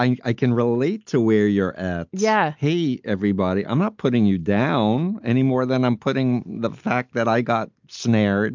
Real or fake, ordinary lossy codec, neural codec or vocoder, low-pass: real; MP3, 64 kbps; none; 7.2 kHz